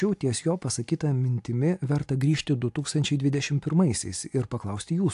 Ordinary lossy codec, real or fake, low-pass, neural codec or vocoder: AAC, 64 kbps; real; 10.8 kHz; none